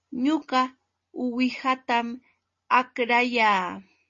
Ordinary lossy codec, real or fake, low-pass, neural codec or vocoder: MP3, 32 kbps; real; 7.2 kHz; none